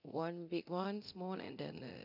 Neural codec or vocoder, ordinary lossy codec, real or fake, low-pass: codec, 24 kHz, 0.9 kbps, DualCodec; none; fake; 5.4 kHz